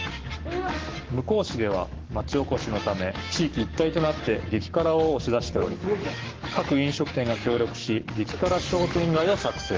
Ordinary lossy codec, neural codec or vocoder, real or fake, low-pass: Opus, 16 kbps; codec, 44.1 kHz, 7.8 kbps, Pupu-Codec; fake; 7.2 kHz